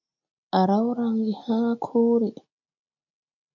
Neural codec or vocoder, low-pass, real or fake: none; 7.2 kHz; real